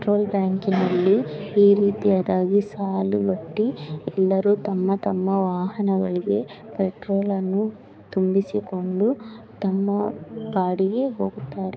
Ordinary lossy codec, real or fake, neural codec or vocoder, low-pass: none; fake; codec, 16 kHz, 4 kbps, X-Codec, HuBERT features, trained on balanced general audio; none